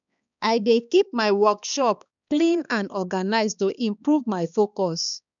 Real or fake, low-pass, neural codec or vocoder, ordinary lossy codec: fake; 7.2 kHz; codec, 16 kHz, 2 kbps, X-Codec, HuBERT features, trained on balanced general audio; none